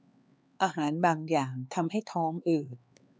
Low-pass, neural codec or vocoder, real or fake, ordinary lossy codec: none; codec, 16 kHz, 4 kbps, X-Codec, HuBERT features, trained on LibriSpeech; fake; none